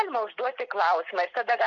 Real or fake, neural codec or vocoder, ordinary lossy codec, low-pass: real; none; MP3, 48 kbps; 7.2 kHz